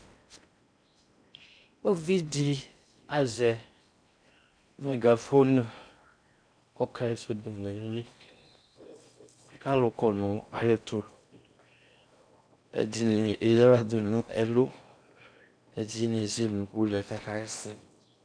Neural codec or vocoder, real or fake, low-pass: codec, 16 kHz in and 24 kHz out, 0.6 kbps, FocalCodec, streaming, 4096 codes; fake; 9.9 kHz